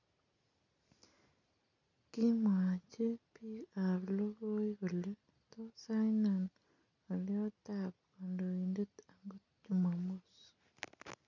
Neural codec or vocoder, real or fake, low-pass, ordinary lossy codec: none; real; 7.2 kHz; none